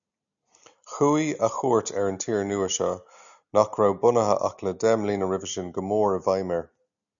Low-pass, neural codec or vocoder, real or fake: 7.2 kHz; none; real